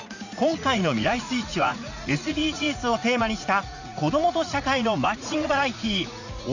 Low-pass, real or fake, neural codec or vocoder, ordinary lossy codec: 7.2 kHz; fake; vocoder, 44.1 kHz, 80 mel bands, Vocos; none